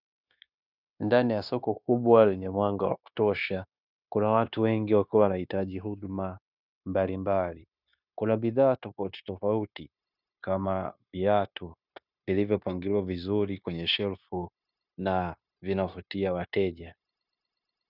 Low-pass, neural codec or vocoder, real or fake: 5.4 kHz; codec, 16 kHz, 0.9 kbps, LongCat-Audio-Codec; fake